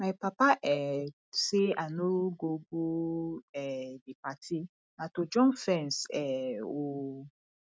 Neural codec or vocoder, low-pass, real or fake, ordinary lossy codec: none; none; real; none